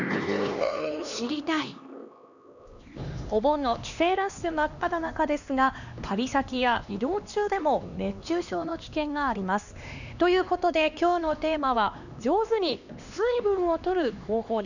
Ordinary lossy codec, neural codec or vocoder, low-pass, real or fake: none; codec, 16 kHz, 2 kbps, X-Codec, HuBERT features, trained on LibriSpeech; 7.2 kHz; fake